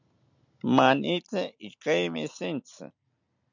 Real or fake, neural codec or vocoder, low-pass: real; none; 7.2 kHz